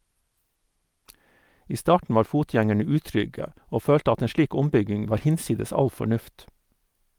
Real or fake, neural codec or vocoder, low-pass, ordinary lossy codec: real; none; 19.8 kHz; Opus, 32 kbps